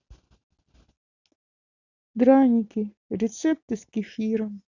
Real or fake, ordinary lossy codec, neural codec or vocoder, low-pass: real; none; none; 7.2 kHz